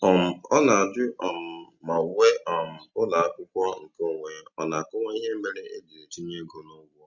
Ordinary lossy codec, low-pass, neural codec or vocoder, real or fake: Opus, 64 kbps; 7.2 kHz; none; real